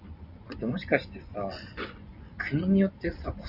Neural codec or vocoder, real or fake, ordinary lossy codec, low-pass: vocoder, 24 kHz, 100 mel bands, Vocos; fake; Opus, 64 kbps; 5.4 kHz